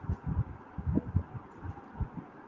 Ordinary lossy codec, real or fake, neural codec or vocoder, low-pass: Opus, 32 kbps; real; none; 7.2 kHz